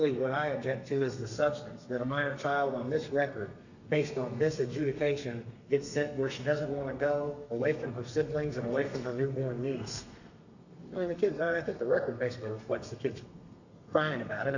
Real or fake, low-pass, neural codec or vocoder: fake; 7.2 kHz; codec, 32 kHz, 1.9 kbps, SNAC